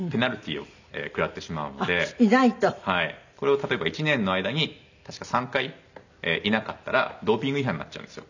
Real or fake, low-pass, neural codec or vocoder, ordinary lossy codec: real; 7.2 kHz; none; none